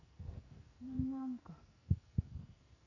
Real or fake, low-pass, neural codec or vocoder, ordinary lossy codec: fake; 7.2 kHz; codec, 44.1 kHz, 2.6 kbps, SNAC; none